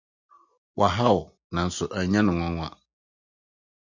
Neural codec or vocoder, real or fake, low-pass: none; real; 7.2 kHz